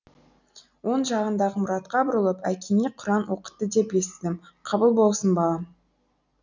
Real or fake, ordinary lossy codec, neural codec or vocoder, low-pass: real; none; none; 7.2 kHz